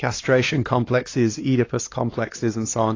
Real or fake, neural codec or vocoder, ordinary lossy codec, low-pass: fake; codec, 16 kHz, 2 kbps, X-Codec, HuBERT features, trained on LibriSpeech; AAC, 32 kbps; 7.2 kHz